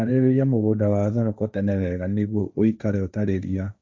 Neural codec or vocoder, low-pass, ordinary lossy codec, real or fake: codec, 16 kHz, 1.1 kbps, Voila-Tokenizer; none; none; fake